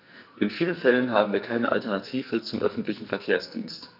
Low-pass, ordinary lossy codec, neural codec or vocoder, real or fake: 5.4 kHz; none; codec, 44.1 kHz, 2.6 kbps, SNAC; fake